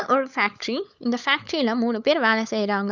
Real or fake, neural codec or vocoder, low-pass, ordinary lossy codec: fake; codec, 16 kHz, 16 kbps, FunCodec, trained on LibriTTS, 50 frames a second; 7.2 kHz; none